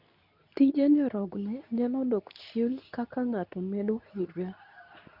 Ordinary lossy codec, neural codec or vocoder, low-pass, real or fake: none; codec, 24 kHz, 0.9 kbps, WavTokenizer, medium speech release version 2; 5.4 kHz; fake